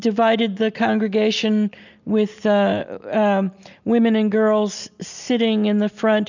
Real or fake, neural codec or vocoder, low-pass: real; none; 7.2 kHz